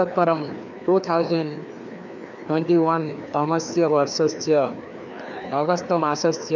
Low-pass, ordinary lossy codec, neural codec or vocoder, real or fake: 7.2 kHz; none; codec, 16 kHz, 2 kbps, FreqCodec, larger model; fake